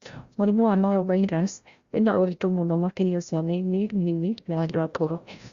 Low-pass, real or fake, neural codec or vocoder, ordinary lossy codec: 7.2 kHz; fake; codec, 16 kHz, 0.5 kbps, FreqCodec, larger model; Opus, 64 kbps